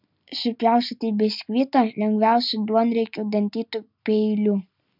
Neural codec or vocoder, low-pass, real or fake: none; 5.4 kHz; real